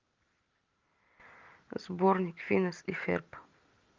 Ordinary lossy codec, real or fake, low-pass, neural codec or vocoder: Opus, 32 kbps; real; 7.2 kHz; none